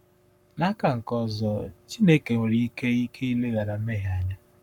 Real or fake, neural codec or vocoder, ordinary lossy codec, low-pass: fake; codec, 44.1 kHz, 7.8 kbps, Pupu-Codec; none; 19.8 kHz